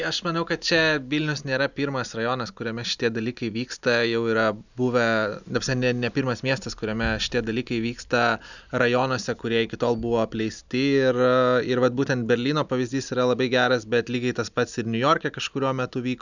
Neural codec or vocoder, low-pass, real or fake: none; 7.2 kHz; real